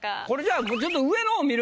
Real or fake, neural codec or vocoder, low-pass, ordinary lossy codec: real; none; none; none